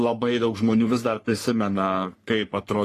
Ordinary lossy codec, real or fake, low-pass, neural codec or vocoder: AAC, 48 kbps; fake; 14.4 kHz; codec, 44.1 kHz, 3.4 kbps, Pupu-Codec